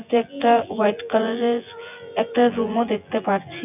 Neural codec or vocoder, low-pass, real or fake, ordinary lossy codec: vocoder, 24 kHz, 100 mel bands, Vocos; 3.6 kHz; fake; none